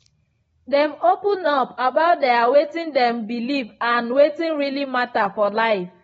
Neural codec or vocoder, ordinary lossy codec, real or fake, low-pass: none; AAC, 24 kbps; real; 19.8 kHz